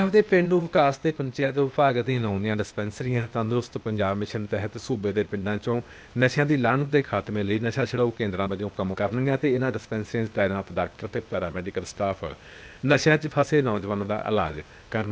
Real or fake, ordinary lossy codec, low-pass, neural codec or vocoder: fake; none; none; codec, 16 kHz, 0.8 kbps, ZipCodec